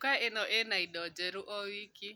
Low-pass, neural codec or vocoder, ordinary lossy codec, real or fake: none; none; none; real